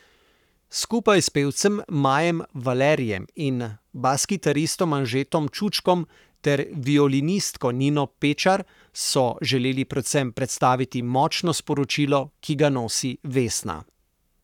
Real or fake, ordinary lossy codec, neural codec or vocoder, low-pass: fake; none; codec, 44.1 kHz, 7.8 kbps, Pupu-Codec; 19.8 kHz